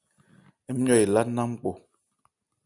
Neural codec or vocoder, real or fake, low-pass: none; real; 10.8 kHz